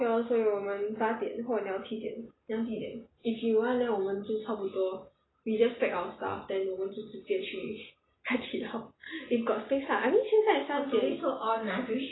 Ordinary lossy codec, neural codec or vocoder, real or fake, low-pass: AAC, 16 kbps; none; real; 7.2 kHz